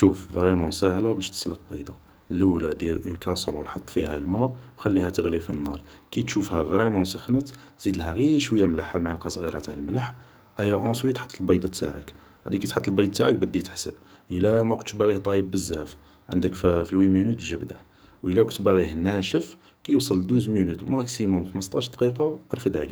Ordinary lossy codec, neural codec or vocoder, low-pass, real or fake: none; codec, 44.1 kHz, 2.6 kbps, SNAC; none; fake